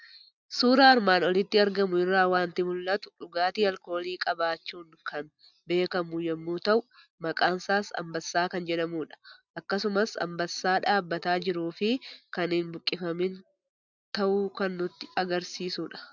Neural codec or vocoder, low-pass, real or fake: none; 7.2 kHz; real